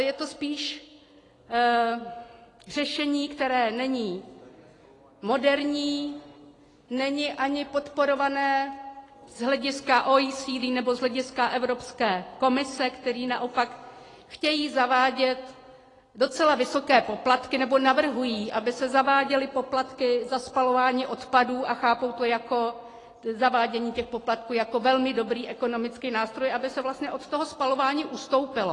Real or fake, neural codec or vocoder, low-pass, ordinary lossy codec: real; none; 10.8 kHz; AAC, 32 kbps